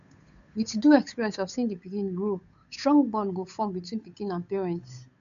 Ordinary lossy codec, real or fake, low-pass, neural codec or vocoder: none; fake; 7.2 kHz; codec, 16 kHz, 8 kbps, FunCodec, trained on Chinese and English, 25 frames a second